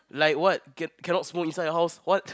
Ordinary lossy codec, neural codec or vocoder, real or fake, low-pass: none; none; real; none